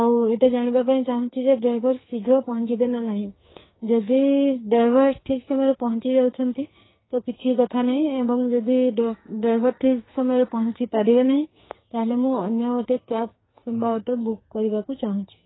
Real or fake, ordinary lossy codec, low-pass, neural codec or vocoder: fake; AAC, 16 kbps; 7.2 kHz; codec, 44.1 kHz, 2.6 kbps, SNAC